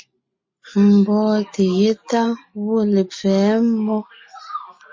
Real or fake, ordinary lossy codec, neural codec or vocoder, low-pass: real; MP3, 32 kbps; none; 7.2 kHz